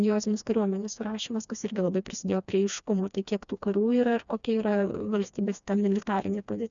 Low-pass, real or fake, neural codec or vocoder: 7.2 kHz; fake; codec, 16 kHz, 2 kbps, FreqCodec, smaller model